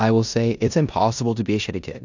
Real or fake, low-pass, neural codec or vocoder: fake; 7.2 kHz; codec, 16 kHz in and 24 kHz out, 0.9 kbps, LongCat-Audio-Codec, four codebook decoder